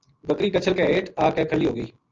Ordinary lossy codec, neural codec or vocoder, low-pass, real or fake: Opus, 24 kbps; none; 7.2 kHz; real